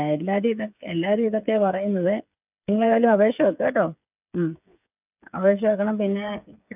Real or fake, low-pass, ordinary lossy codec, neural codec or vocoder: fake; 3.6 kHz; none; codec, 16 kHz, 8 kbps, FreqCodec, smaller model